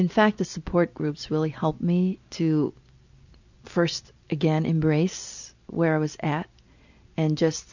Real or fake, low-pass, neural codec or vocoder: real; 7.2 kHz; none